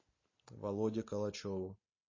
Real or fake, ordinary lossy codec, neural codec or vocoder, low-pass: real; MP3, 32 kbps; none; 7.2 kHz